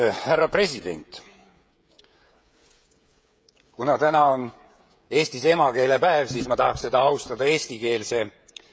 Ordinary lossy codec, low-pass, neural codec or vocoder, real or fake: none; none; codec, 16 kHz, 16 kbps, FreqCodec, smaller model; fake